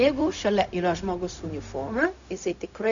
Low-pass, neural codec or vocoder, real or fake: 7.2 kHz; codec, 16 kHz, 0.4 kbps, LongCat-Audio-Codec; fake